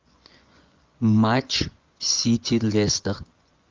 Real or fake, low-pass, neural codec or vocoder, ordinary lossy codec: fake; 7.2 kHz; vocoder, 24 kHz, 100 mel bands, Vocos; Opus, 32 kbps